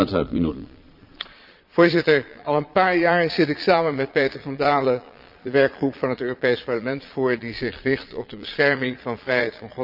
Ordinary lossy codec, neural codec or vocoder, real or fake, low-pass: Opus, 64 kbps; vocoder, 22.05 kHz, 80 mel bands, Vocos; fake; 5.4 kHz